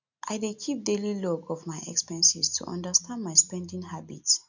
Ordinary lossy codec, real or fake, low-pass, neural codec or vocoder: none; real; 7.2 kHz; none